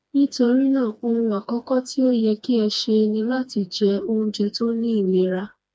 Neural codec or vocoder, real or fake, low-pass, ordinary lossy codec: codec, 16 kHz, 2 kbps, FreqCodec, smaller model; fake; none; none